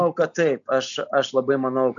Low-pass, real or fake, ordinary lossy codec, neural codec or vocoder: 7.2 kHz; real; MP3, 96 kbps; none